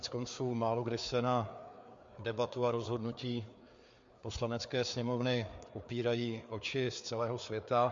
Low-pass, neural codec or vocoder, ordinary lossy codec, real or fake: 7.2 kHz; codec, 16 kHz, 4 kbps, FreqCodec, larger model; MP3, 48 kbps; fake